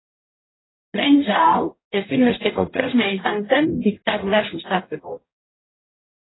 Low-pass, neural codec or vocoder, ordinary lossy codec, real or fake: 7.2 kHz; codec, 44.1 kHz, 0.9 kbps, DAC; AAC, 16 kbps; fake